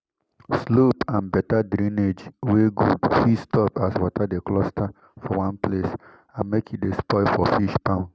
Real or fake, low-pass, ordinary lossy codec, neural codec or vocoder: real; none; none; none